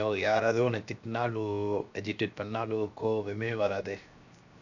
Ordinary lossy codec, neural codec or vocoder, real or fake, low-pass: none; codec, 16 kHz, 0.7 kbps, FocalCodec; fake; 7.2 kHz